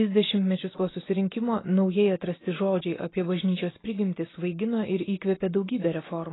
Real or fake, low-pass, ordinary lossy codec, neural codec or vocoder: real; 7.2 kHz; AAC, 16 kbps; none